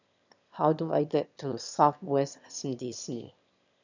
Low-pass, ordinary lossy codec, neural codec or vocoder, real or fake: 7.2 kHz; none; autoencoder, 22.05 kHz, a latent of 192 numbers a frame, VITS, trained on one speaker; fake